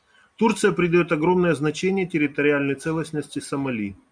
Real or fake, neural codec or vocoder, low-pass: real; none; 9.9 kHz